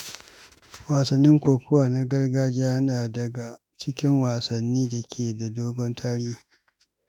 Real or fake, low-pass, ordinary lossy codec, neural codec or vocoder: fake; 19.8 kHz; none; autoencoder, 48 kHz, 32 numbers a frame, DAC-VAE, trained on Japanese speech